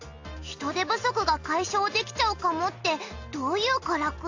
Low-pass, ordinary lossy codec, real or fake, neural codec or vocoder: 7.2 kHz; AAC, 48 kbps; real; none